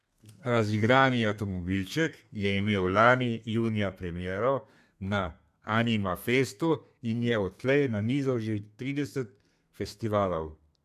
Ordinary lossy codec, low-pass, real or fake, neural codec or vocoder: MP3, 96 kbps; 14.4 kHz; fake; codec, 32 kHz, 1.9 kbps, SNAC